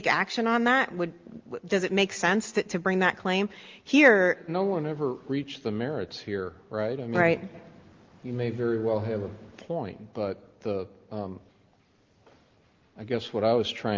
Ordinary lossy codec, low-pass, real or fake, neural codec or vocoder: Opus, 32 kbps; 7.2 kHz; real; none